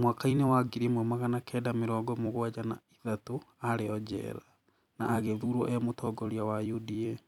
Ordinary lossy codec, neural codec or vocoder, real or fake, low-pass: none; vocoder, 44.1 kHz, 128 mel bands every 256 samples, BigVGAN v2; fake; 19.8 kHz